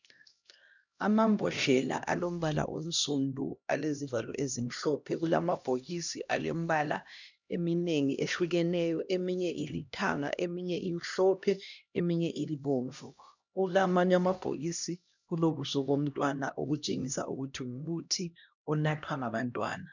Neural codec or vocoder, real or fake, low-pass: codec, 16 kHz, 1 kbps, X-Codec, HuBERT features, trained on LibriSpeech; fake; 7.2 kHz